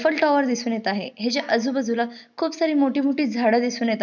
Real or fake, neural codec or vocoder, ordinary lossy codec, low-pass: real; none; none; 7.2 kHz